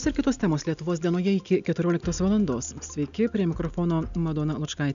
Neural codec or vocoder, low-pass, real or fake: none; 7.2 kHz; real